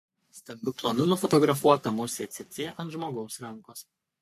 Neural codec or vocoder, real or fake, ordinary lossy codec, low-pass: codec, 44.1 kHz, 3.4 kbps, Pupu-Codec; fake; MP3, 64 kbps; 14.4 kHz